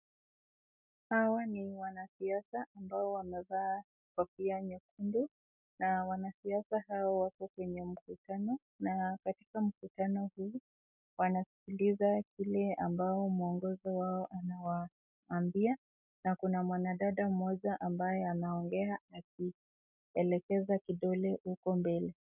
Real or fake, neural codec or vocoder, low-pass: real; none; 3.6 kHz